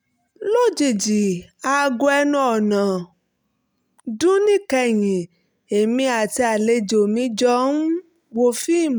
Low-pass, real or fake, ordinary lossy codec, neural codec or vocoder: none; real; none; none